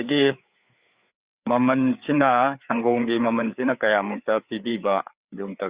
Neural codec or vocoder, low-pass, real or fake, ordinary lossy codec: codec, 16 kHz, 4 kbps, FreqCodec, larger model; 3.6 kHz; fake; Opus, 64 kbps